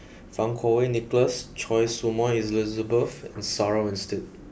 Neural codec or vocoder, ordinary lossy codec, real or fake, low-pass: none; none; real; none